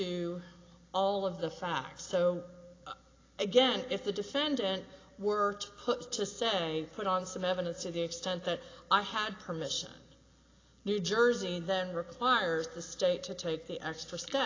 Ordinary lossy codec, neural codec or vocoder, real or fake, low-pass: AAC, 32 kbps; none; real; 7.2 kHz